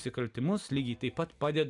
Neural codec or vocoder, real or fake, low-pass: none; real; 10.8 kHz